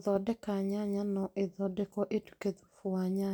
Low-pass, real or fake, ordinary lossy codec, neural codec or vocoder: none; real; none; none